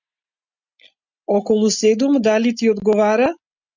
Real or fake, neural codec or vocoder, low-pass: real; none; 7.2 kHz